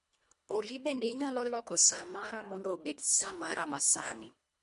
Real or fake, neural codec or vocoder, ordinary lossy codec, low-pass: fake; codec, 24 kHz, 1.5 kbps, HILCodec; MP3, 64 kbps; 10.8 kHz